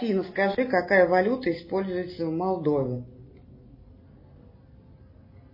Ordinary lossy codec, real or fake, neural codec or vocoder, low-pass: MP3, 24 kbps; real; none; 5.4 kHz